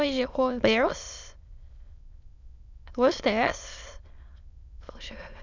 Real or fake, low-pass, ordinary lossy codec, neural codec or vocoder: fake; 7.2 kHz; none; autoencoder, 22.05 kHz, a latent of 192 numbers a frame, VITS, trained on many speakers